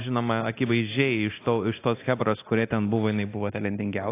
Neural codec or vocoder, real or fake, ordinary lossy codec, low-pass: codec, 24 kHz, 0.9 kbps, DualCodec; fake; AAC, 24 kbps; 3.6 kHz